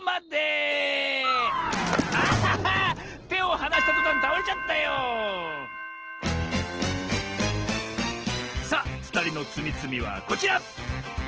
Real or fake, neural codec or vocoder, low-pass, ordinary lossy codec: real; none; 7.2 kHz; Opus, 16 kbps